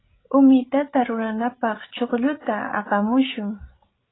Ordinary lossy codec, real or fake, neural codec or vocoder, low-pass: AAC, 16 kbps; fake; codec, 16 kHz, 16 kbps, FreqCodec, larger model; 7.2 kHz